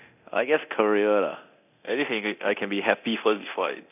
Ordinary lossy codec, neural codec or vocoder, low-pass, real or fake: none; codec, 24 kHz, 0.9 kbps, DualCodec; 3.6 kHz; fake